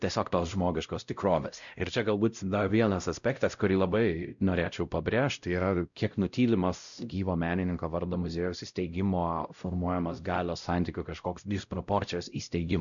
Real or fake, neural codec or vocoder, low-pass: fake; codec, 16 kHz, 0.5 kbps, X-Codec, WavLM features, trained on Multilingual LibriSpeech; 7.2 kHz